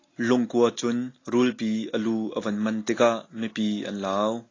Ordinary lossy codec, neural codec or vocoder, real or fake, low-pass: AAC, 32 kbps; none; real; 7.2 kHz